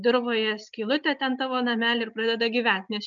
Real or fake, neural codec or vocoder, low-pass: real; none; 7.2 kHz